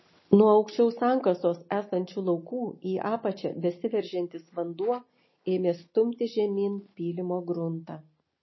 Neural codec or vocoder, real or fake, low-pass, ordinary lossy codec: none; real; 7.2 kHz; MP3, 24 kbps